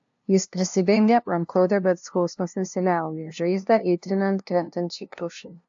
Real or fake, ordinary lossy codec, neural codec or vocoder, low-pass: fake; AAC, 64 kbps; codec, 16 kHz, 0.5 kbps, FunCodec, trained on LibriTTS, 25 frames a second; 7.2 kHz